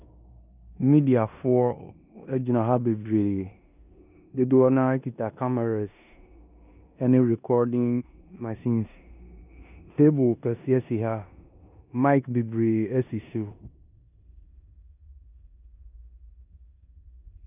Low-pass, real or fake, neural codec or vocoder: 3.6 kHz; fake; codec, 16 kHz in and 24 kHz out, 0.9 kbps, LongCat-Audio-Codec, four codebook decoder